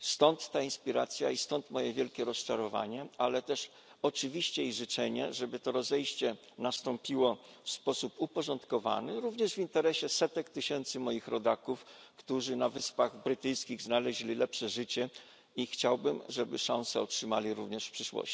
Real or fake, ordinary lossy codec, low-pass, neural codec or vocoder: real; none; none; none